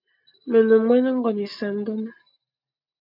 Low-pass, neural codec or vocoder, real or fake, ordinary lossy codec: 5.4 kHz; none; real; MP3, 48 kbps